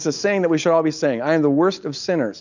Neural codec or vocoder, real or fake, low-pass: none; real; 7.2 kHz